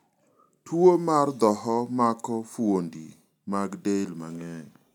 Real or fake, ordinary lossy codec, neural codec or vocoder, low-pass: real; none; none; 19.8 kHz